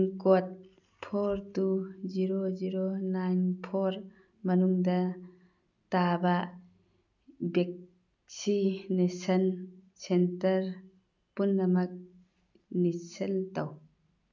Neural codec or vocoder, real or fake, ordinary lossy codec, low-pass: none; real; none; 7.2 kHz